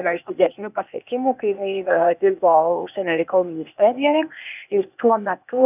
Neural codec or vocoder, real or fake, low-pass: codec, 16 kHz, 0.8 kbps, ZipCodec; fake; 3.6 kHz